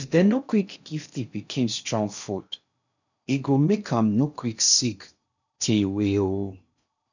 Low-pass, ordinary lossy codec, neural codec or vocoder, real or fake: 7.2 kHz; none; codec, 16 kHz in and 24 kHz out, 0.6 kbps, FocalCodec, streaming, 2048 codes; fake